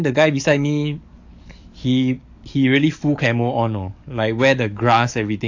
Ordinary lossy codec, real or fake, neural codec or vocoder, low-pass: AAC, 48 kbps; fake; codec, 44.1 kHz, 7.8 kbps, DAC; 7.2 kHz